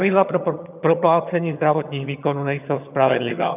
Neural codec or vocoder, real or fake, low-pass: vocoder, 22.05 kHz, 80 mel bands, HiFi-GAN; fake; 3.6 kHz